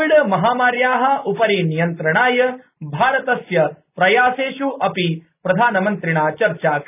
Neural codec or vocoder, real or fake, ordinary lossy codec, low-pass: none; real; none; 3.6 kHz